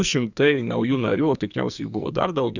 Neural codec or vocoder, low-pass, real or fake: codec, 44.1 kHz, 2.6 kbps, SNAC; 7.2 kHz; fake